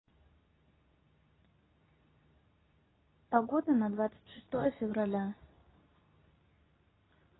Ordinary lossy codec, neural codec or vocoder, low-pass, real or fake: AAC, 16 kbps; codec, 24 kHz, 0.9 kbps, WavTokenizer, medium speech release version 2; 7.2 kHz; fake